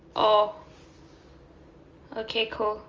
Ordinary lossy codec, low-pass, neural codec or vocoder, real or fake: Opus, 32 kbps; 7.2 kHz; none; real